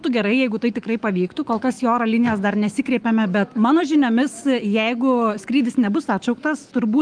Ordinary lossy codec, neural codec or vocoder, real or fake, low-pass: Opus, 24 kbps; autoencoder, 48 kHz, 128 numbers a frame, DAC-VAE, trained on Japanese speech; fake; 9.9 kHz